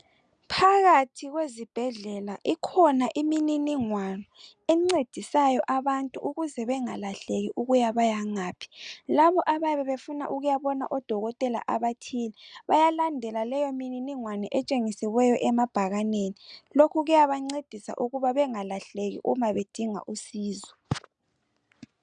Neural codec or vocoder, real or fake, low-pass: none; real; 9.9 kHz